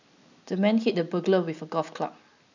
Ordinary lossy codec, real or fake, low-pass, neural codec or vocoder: none; real; 7.2 kHz; none